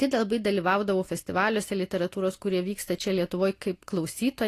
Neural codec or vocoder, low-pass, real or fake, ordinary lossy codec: none; 14.4 kHz; real; AAC, 48 kbps